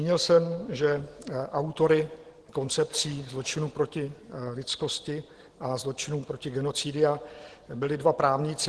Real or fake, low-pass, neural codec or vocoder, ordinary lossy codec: real; 10.8 kHz; none; Opus, 16 kbps